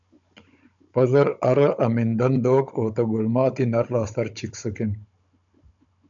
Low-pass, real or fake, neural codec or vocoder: 7.2 kHz; fake; codec, 16 kHz, 16 kbps, FunCodec, trained on Chinese and English, 50 frames a second